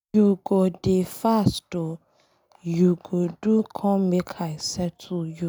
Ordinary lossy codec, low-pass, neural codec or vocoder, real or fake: none; none; none; real